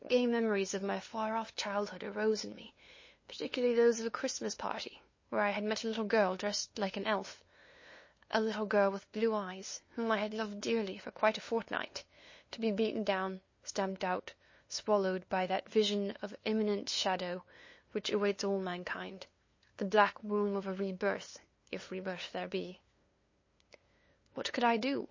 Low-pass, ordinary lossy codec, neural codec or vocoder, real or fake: 7.2 kHz; MP3, 32 kbps; codec, 16 kHz, 2 kbps, FunCodec, trained on LibriTTS, 25 frames a second; fake